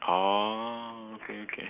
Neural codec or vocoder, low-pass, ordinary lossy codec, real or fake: none; 3.6 kHz; none; real